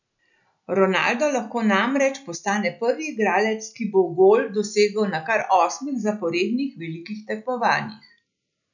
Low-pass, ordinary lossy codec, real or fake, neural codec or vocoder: 7.2 kHz; none; real; none